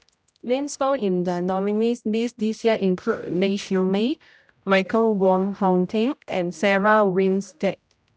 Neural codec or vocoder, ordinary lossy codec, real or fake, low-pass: codec, 16 kHz, 0.5 kbps, X-Codec, HuBERT features, trained on general audio; none; fake; none